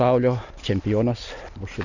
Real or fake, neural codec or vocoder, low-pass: real; none; 7.2 kHz